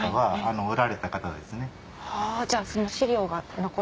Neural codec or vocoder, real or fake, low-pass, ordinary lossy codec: none; real; none; none